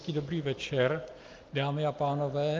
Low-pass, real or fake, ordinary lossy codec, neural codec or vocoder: 7.2 kHz; real; Opus, 24 kbps; none